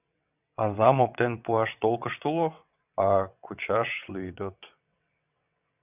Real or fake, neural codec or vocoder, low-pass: fake; vocoder, 22.05 kHz, 80 mel bands, WaveNeXt; 3.6 kHz